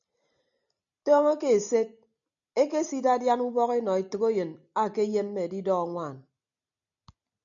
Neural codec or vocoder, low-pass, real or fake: none; 7.2 kHz; real